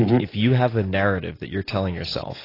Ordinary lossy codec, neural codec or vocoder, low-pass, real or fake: AAC, 24 kbps; none; 5.4 kHz; real